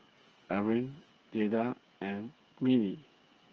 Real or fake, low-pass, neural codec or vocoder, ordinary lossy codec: fake; 7.2 kHz; codec, 16 kHz, 8 kbps, FreqCodec, smaller model; Opus, 24 kbps